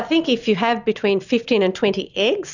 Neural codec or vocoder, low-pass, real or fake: none; 7.2 kHz; real